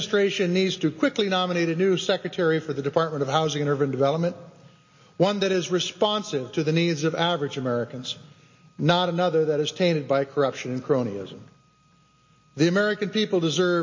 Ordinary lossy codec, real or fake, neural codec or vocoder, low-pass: MP3, 32 kbps; real; none; 7.2 kHz